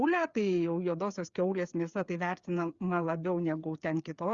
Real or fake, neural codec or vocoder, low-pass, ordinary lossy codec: fake; codec, 16 kHz, 8 kbps, FreqCodec, smaller model; 7.2 kHz; Opus, 64 kbps